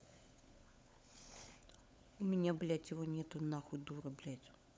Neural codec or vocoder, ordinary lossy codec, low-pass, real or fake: codec, 16 kHz, 16 kbps, FunCodec, trained on LibriTTS, 50 frames a second; none; none; fake